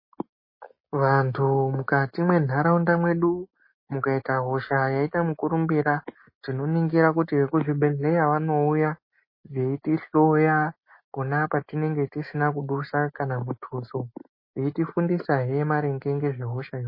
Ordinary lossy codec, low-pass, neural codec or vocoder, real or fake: MP3, 24 kbps; 5.4 kHz; none; real